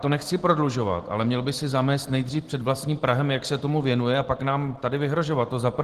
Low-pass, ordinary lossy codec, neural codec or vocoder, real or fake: 14.4 kHz; Opus, 16 kbps; none; real